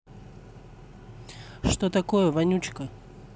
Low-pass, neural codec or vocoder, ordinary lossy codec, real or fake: none; none; none; real